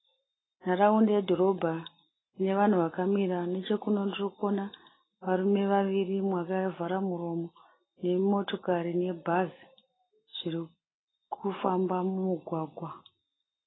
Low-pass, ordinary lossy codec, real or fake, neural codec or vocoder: 7.2 kHz; AAC, 16 kbps; real; none